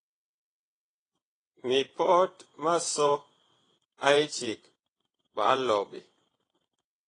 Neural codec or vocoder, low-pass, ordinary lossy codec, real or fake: vocoder, 22.05 kHz, 80 mel bands, WaveNeXt; 9.9 kHz; AAC, 32 kbps; fake